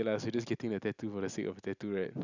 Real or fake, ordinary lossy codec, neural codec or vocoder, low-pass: real; none; none; 7.2 kHz